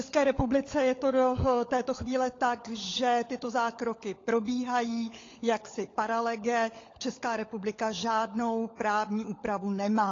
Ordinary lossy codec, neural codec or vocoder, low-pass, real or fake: AAC, 32 kbps; codec, 16 kHz, 16 kbps, FunCodec, trained on LibriTTS, 50 frames a second; 7.2 kHz; fake